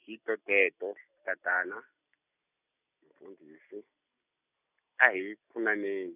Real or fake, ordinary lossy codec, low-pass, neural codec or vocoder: real; none; 3.6 kHz; none